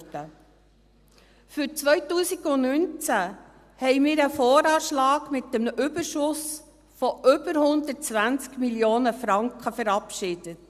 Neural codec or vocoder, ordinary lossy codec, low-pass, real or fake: none; Opus, 64 kbps; 14.4 kHz; real